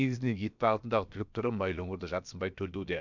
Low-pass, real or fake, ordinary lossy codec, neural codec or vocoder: 7.2 kHz; fake; none; codec, 16 kHz, about 1 kbps, DyCAST, with the encoder's durations